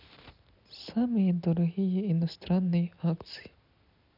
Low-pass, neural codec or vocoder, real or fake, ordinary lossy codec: 5.4 kHz; none; real; none